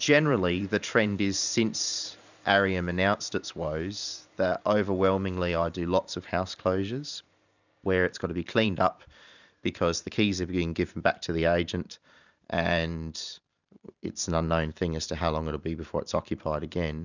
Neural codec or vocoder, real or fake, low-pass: vocoder, 44.1 kHz, 128 mel bands every 512 samples, BigVGAN v2; fake; 7.2 kHz